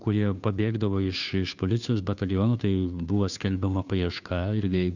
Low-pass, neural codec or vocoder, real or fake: 7.2 kHz; codec, 16 kHz, 2 kbps, FunCodec, trained on Chinese and English, 25 frames a second; fake